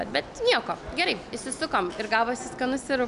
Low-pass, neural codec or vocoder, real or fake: 10.8 kHz; none; real